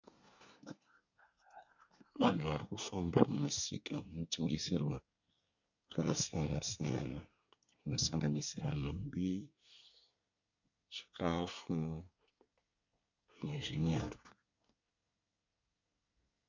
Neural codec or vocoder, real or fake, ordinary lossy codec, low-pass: codec, 24 kHz, 1 kbps, SNAC; fake; MP3, 64 kbps; 7.2 kHz